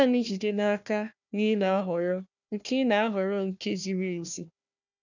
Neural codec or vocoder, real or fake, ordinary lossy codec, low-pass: codec, 16 kHz, 1 kbps, FunCodec, trained on Chinese and English, 50 frames a second; fake; none; 7.2 kHz